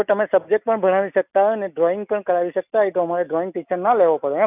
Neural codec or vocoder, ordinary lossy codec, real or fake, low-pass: none; none; real; 3.6 kHz